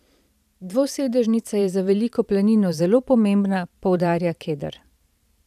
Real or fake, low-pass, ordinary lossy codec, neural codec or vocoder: fake; 14.4 kHz; none; vocoder, 44.1 kHz, 128 mel bands every 512 samples, BigVGAN v2